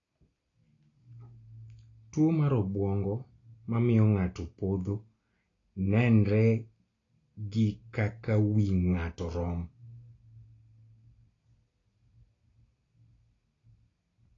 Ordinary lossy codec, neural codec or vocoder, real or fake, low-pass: AAC, 32 kbps; none; real; 7.2 kHz